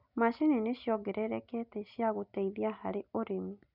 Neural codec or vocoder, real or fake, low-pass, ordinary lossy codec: none; real; 5.4 kHz; none